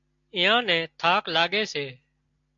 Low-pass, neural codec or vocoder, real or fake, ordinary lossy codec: 7.2 kHz; none; real; AAC, 64 kbps